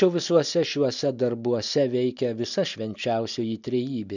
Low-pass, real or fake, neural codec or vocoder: 7.2 kHz; real; none